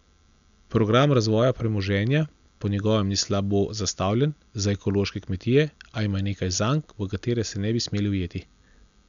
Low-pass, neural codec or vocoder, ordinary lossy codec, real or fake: 7.2 kHz; none; MP3, 96 kbps; real